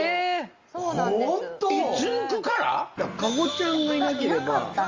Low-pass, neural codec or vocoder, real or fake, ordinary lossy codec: 7.2 kHz; none; real; Opus, 32 kbps